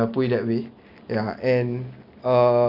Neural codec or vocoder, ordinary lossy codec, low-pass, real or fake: none; Opus, 64 kbps; 5.4 kHz; real